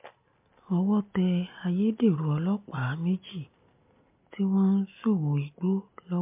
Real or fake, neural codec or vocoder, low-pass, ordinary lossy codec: real; none; 3.6 kHz; MP3, 32 kbps